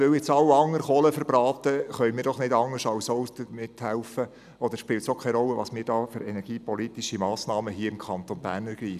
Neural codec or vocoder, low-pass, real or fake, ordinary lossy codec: none; 14.4 kHz; real; none